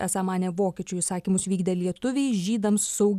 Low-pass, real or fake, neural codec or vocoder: 14.4 kHz; real; none